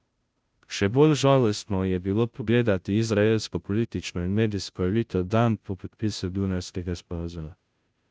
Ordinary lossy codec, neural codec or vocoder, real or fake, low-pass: none; codec, 16 kHz, 0.5 kbps, FunCodec, trained on Chinese and English, 25 frames a second; fake; none